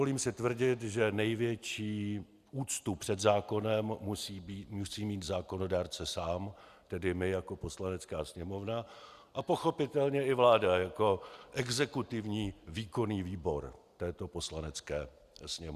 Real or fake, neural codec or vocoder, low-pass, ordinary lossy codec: real; none; 14.4 kHz; Opus, 64 kbps